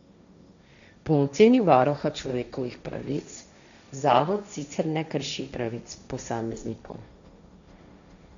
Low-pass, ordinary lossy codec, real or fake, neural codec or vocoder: 7.2 kHz; none; fake; codec, 16 kHz, 1.1 kbps, Voila-Tokenizer